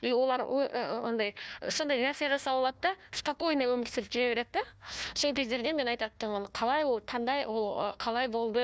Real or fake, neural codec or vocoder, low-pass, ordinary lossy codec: fake; codec, 16 kHz, 1 kbps, FunCodec, trained on Chinese and English, 50 frames a second; none; none